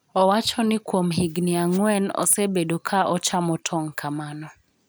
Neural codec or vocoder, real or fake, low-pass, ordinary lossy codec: none; real; none; none